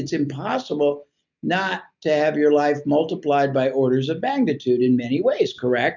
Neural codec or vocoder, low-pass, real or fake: none; 7.2 kHz; real